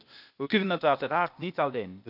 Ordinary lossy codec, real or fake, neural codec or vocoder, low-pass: none; fake; codec, 16 kHz, 0.8 kbps, ZipCodec; 5.4 kHz